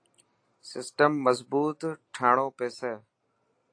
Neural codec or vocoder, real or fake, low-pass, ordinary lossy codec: none; real; 9.9 kHz; AAC, 48 kbps